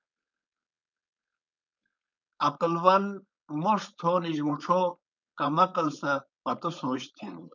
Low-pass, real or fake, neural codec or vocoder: 7.2 kHz; fake; codec, 16 kHz, 4.8 kbps, FACodec